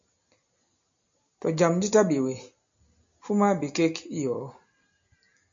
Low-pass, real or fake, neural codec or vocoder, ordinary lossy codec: 7.2 kHz; real; none; AAC, 64 kbps